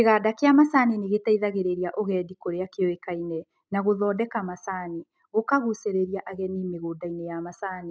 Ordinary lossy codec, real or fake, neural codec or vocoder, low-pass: none; real; none; none